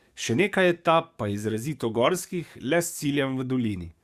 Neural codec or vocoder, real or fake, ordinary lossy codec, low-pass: codec, 44.1 kHz, 7.8 kbps, DAC; fake; Opus, 64 kbps; 14.4 kHz